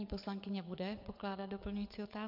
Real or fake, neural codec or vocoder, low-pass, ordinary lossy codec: fake; vocoder, 22.05 kHz, 80 mel bands, WaveNeXt; 5.4 kHz; MP3, 48 kbps